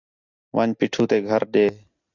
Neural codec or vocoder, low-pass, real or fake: none; 7.2 kHz; real